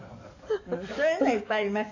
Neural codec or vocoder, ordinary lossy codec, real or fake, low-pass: codec, 44.1 kHz, 7.8 kbps, DAC; AAC, 48 kbps; fake; 7.2 kHz